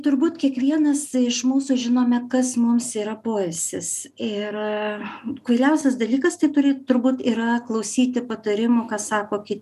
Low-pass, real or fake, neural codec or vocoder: 14.4 kHz; real; none